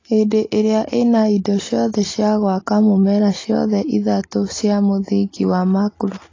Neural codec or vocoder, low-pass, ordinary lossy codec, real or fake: none; 7.2 kHz; AAC, 32 kbps; real